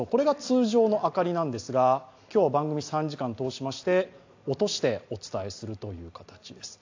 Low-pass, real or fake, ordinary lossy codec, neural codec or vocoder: 7.2 kHz; real; AAC, 48 kbps; none